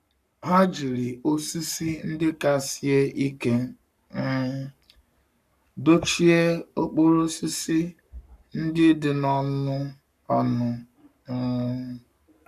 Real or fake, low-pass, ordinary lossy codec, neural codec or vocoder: fake; 14.4 kHz; none; codec, 44.1 kHz, 7.8 kbps, Pupu-Codec